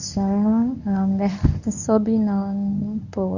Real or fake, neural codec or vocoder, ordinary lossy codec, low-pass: fake; codec, 24 kHz, 0.9 kbps, WavTokenizer, medium speech release version 1; none; 7.2 kHz